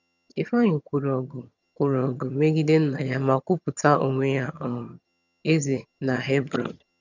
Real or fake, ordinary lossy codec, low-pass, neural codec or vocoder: fake; none; 7.2 kHz; vocoder, 22.05 kHz, 80 mel bands, HiFi-GAN